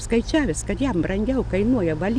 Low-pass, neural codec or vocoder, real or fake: 9.9 kHz; none; real